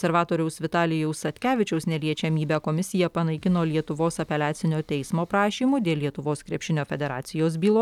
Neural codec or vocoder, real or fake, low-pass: none; real; 19.8 kHz